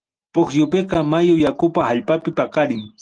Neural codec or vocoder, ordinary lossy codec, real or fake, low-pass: none; Opus, 24 kbps; real; 9.9 kHz